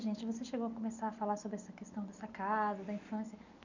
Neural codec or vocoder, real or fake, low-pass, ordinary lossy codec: none; real; 7.2 kHz; none